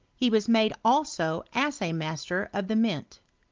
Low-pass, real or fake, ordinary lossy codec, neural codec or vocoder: 7.2 kHz; real; Opus, 32 kbps; none